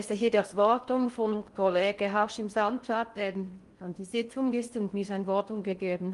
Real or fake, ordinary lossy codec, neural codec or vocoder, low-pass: fake; Opus, 32 kbps; codec, 16 kHz in and 24 kHz out, 0.6 kbps, FocalCodec, streaming, 4096 codes; 10.8 kHz